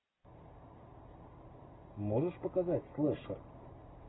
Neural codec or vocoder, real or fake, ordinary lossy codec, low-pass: none; real; AAC, 16 kbps; 7.2 kHz